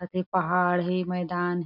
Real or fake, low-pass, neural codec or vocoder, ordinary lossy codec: real; 5.4 kHz; none; none